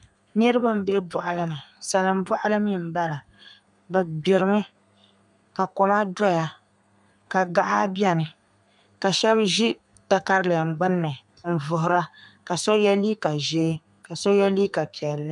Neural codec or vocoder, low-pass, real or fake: codec, 32 kHz, 1.9 kbps, SNAC; 10.8 kHz; fake